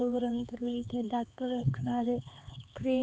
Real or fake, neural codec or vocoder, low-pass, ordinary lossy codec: fake; codec, 16 kHz, 4 kbps, X-Codec, HuBERT features, trained on LibriSpeech; none; none